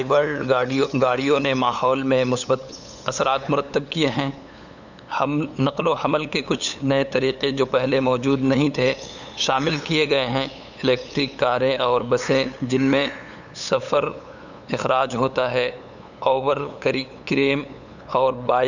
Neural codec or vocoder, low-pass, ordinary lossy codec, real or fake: codec, 16 kHz, 8 kbps, FunCodec, trained on LibriTTS, 25 frames a second; 7.2 kHz; none; fake